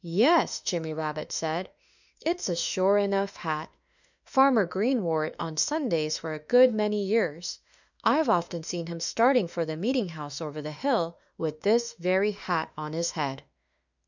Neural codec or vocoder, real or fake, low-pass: autoencoder, 48 kHz, 32 numbers a frame, DAC-VAE, trained on Japanese speech; fake; 7.2 kHz